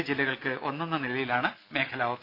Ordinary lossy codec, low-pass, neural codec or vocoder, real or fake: AAC, 32 kbps; 5.4 kHz; none; real